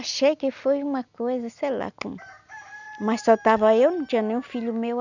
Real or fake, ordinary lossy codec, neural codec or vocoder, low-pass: real; none; none; 7.2 kHz